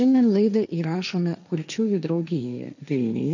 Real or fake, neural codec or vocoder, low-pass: fake; codec, 16 kHz, 1.1 kbps, Voila-Tokenizer; 7.2 kHz